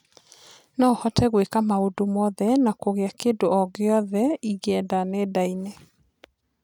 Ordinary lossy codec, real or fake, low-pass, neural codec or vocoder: none; real; 19.8 kHz; none